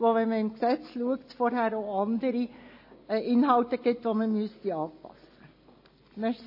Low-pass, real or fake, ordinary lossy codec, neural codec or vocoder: 5.4 kHz; real; MP3, 24 kbps; none